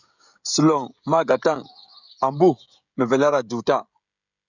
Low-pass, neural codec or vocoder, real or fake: 7.2 kHz; codec, 16 kHz, 16 kbps, FreqCodec, smaller model; fake